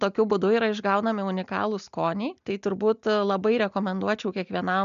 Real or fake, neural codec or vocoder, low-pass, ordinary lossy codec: real; none; 7.2 kHz; MP3, 96 kbps